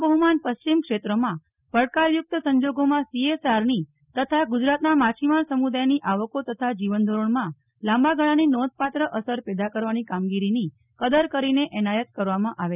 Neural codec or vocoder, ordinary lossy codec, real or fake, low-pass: none; none; real; 3.6 kHz